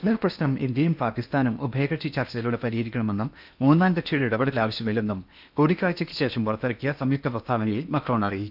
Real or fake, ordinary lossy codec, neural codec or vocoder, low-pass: fake; none; codec, 16 kHz in and 24 kHz out, 0.8 kbps, FocalCodec, streaming, 65536 codes; 5.4 kHz